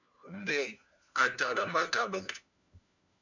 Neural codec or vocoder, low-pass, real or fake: codec, 16 kHz, 1 kbps, FunCodec, trained on LibriTTS, 50 frames a second; 7.2 kHz; fake